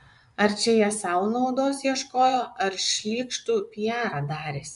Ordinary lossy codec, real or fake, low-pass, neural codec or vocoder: MP3, 96 kbps; fake; 10.8 kHz; vocoder, 24 kHz, 100 mel bands, Vocos